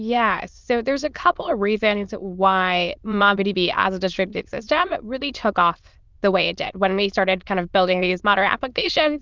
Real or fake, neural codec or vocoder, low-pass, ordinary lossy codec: fake; autoencoder, 22.05 kHz, a latent of 192 numbers a frame, VITS, trained on many speakers; 7.2 kHz; Opus, 24 kbps